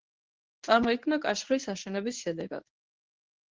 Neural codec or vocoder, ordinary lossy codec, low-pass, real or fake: codec, 24 kHz, 0.9 kbps, WavTokenizer, medium speech release version 1; Opus, 16 kbps; 7.2 kHz; fake